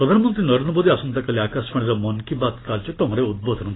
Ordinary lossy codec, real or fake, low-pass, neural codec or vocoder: AAC, 16 kbps; real; 7.2 kHz; none